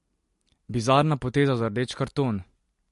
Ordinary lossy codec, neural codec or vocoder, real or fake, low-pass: MP3, 48 kbps; none; real; 14.4 kHz